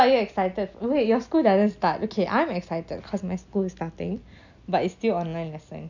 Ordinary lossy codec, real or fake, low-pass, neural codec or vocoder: none; real; 7.2 kHz; none